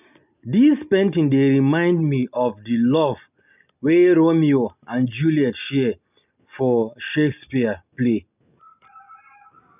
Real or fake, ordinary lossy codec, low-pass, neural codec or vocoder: real; none; 3.6 kHz; none